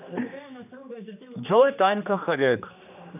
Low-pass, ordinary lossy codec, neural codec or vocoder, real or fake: 3.6 kHz; none; codec, 16 kHz, 2 kbps, X-Codec, HuBERT features, trained on general audio; fake